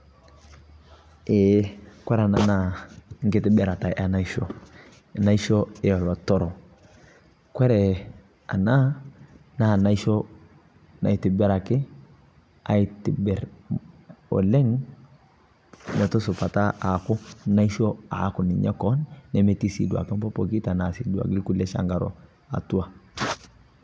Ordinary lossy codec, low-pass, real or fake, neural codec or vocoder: none; none; real; none